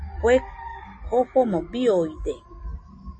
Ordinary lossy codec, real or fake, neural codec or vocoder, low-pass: MP3, 32 kbps; real; none; 9.9 kHz